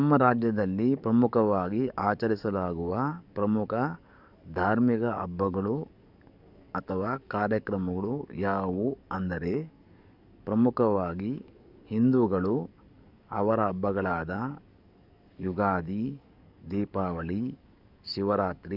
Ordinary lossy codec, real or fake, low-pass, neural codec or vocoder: none; fake; 5.4 kHz; codec, 44.1 kHz, 7.8 kbps, DAC